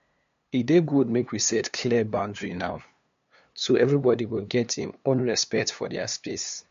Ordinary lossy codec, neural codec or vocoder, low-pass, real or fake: MP3, 48 kbps; codec, 16 kHz, 2 kbps, FunCodec, trained on LibriTTS, 25 frames a second; 7.2 kHz; fake